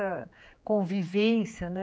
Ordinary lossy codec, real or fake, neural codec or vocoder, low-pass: none; fake; codec, 16 kHz, 4 kbps, X-Codec, HuBERT features, trained on general audio; none